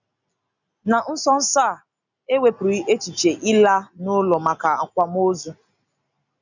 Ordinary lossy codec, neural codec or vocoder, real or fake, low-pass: none; none; real; 7.2 kHz